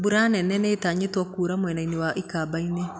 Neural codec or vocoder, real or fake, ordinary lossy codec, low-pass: none; real; none; none